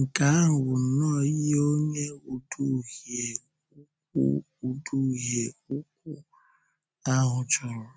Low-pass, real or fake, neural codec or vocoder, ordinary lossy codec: none; real; none; none